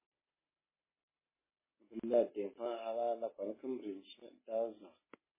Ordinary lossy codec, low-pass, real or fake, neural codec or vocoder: MP3, 16 kbps; 3.6 kHz; real; none